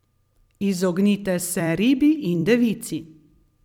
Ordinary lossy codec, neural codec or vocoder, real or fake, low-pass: none; vocoder, 44.1 kHz, 128 mel bands every 256 samples, BigVGAN v2; fake; 19.8 kHz